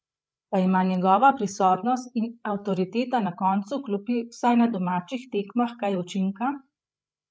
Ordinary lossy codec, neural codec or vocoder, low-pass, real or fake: none; codec, 16 kHz, 8 kbps, FreqCodec, larger model; none; fake